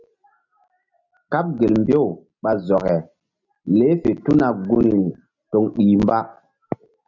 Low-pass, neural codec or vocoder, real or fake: 7.2 kHz; none; real